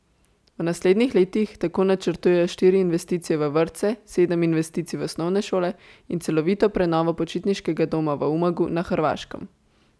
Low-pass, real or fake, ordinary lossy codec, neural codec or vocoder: none; real; none; none